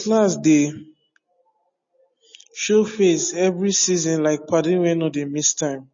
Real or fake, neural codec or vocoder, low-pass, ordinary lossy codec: real; none; 7.2 kHz; MP3, 32 kbps